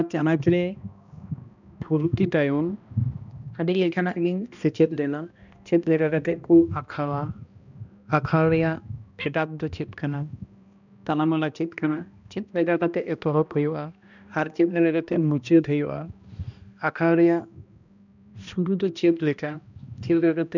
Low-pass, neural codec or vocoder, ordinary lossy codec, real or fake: 7.2 kHz; codec, 16 kHz, 1 kbps, X-Codec, HuBERT features, trained on balanced general audio; none; fake